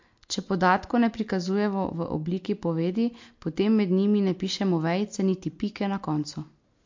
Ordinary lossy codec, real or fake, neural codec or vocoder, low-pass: MP3, 48 kbps; real; none; 7.2 kHz